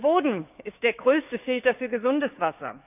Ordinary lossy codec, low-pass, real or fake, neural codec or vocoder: none; 3.6 kHz; fake; codec, 16 kHz, 6 kbps, DAC